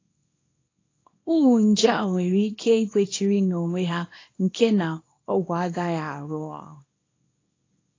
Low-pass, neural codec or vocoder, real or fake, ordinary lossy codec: 7.2 kHz; codec, 24 kHz, 0.9 kbps, WavTokenizer, small release; fake; AAC, 32 kbps